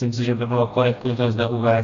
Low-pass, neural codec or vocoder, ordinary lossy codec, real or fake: 7.2 kHz; codec, 16 kHz, 1 kbps, FreqCodec, smaller model; MP3, 64 kbps; fake